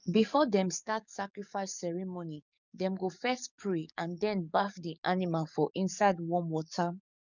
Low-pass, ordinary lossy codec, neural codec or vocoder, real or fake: 7.2 kHz; none; codec, 44.1 kHz, 7.8 kbps, DAC; fake